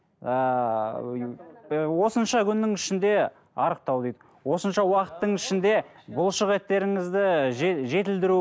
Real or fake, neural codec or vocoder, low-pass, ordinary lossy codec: real; none; none; none